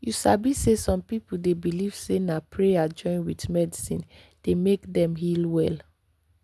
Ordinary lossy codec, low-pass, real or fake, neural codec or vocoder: none; none; real; none